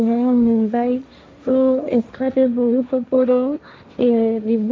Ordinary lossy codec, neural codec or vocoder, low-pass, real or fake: none; codec, 16 kHz, 1.1 kbps, Voila-Tokenizer; none; fake